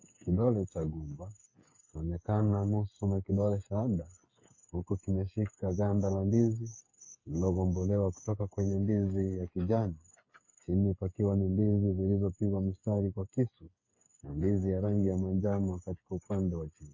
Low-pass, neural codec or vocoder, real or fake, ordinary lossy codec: 7.2 kHz; codec, 16 kHz, 8 kbps, FreqCodec, smaller model; fake; MP3, 32 kbps